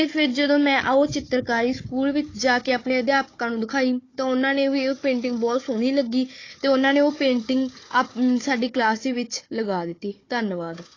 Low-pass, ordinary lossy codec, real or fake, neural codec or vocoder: 7.2 kHz; AAC, 32 kbps; fake; codec, 16 kHz, 4 kbps, FunCodec, trained on Chinese and English, 50 frames a second